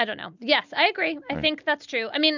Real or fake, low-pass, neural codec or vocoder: real; 7.2 kHz; none